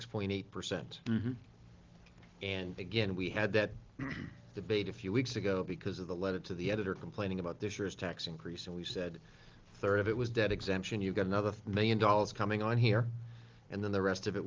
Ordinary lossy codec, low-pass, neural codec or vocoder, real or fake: Opus, 32 kbps; 7.2 kHz; none; real